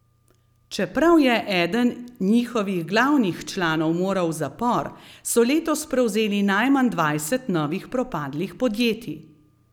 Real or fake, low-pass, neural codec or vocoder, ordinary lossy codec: real; 19.8 kHz; none; none